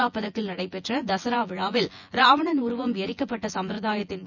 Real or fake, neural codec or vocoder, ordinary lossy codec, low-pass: fake; vocoder, 24 kHz, 100 mel bands, Vocos; none; 7.2 kHz